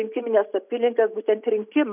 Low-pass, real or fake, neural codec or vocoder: 3.6 kHz; real; none